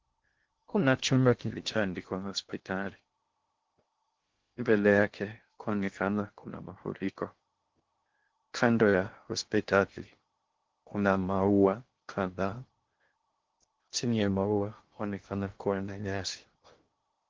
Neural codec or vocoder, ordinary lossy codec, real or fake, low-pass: codec, 16 kHz in and 24 kHz out, 0.6 kbps, FocalCodec, streaming, 2048 codes; Opus, 16 kbps; fake; 7.2 kHz